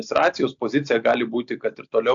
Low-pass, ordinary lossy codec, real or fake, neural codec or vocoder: 7.2 kHz; AAC, 64 kbps; real; none